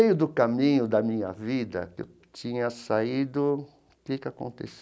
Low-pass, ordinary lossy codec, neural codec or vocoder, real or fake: none; none; none; real